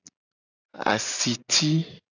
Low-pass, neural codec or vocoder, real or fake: 7.2 kHz; none; real